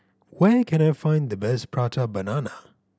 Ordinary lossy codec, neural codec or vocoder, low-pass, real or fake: none; none; none; real